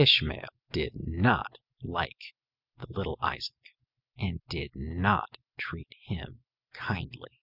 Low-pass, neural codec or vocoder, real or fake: 5.4 kHz; none; real